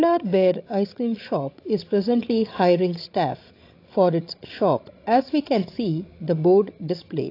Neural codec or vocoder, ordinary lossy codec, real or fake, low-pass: codec, 16 kHz, 16 kbps, FreqCodec, larger model; AAC, 32 kbps; fake; 5.4 kHz